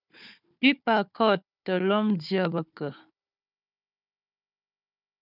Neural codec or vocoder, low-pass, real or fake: codec, 16 kHz, 4 kbps, FunCodec, trained on Chinese and English, 50 frames a second; 5.4 kHz; fake